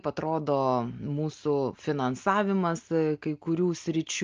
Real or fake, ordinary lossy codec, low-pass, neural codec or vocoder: real; Opus, 24 kbps; 7.2 kHz; none